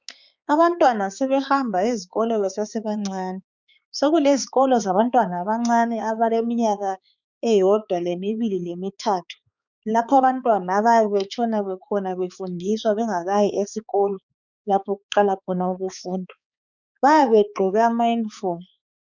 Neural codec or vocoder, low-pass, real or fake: codec, 16 kHz, 4 kbps, X-Codec, HuBERT features, trained on balanced general audio; 7.2 kHz; fake